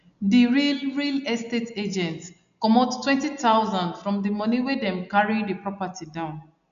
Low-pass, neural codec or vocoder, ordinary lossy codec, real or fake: 7.2 kHz; none; none; real